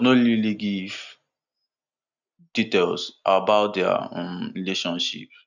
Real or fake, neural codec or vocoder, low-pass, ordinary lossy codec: real; none; 7.2 kHz; none